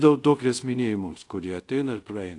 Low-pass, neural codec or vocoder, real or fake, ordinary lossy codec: 10.8 kHz; codec, 24 kHz, 0.5 kbps, DualCodec; fake; AAC, 48 kbps